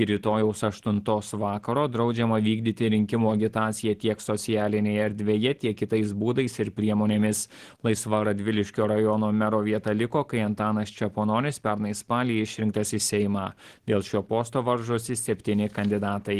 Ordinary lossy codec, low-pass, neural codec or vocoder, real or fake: Opus, 16 kbps; 14.4 kHz; none; real